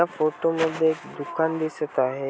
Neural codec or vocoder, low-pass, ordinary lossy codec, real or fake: none; none; none; real